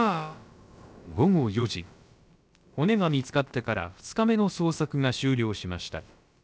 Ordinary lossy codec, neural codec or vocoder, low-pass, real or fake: none; codec, 16 kHz, about 1 kbps, DyCAST, with the encoder's durations; none; fake